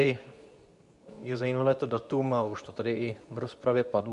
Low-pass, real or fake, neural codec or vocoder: 10.8 kHz; fake; codec, 24 kHz, 0.9 kbps, WavTokenizer, medium speech release version 2